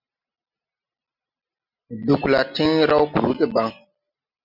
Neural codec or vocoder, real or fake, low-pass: none; real; 5.4 kHz